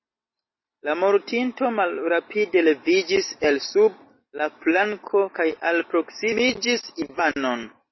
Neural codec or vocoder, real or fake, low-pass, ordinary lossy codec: none; real; 7.2 kHz; MP3, 24 kbps